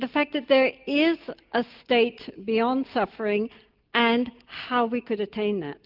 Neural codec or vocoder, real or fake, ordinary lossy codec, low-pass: none; real; Opus, 32 kbps; 5.4 kHz